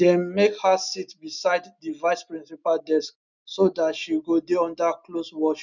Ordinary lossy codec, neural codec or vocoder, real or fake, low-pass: none; none; real; 7.2 kHz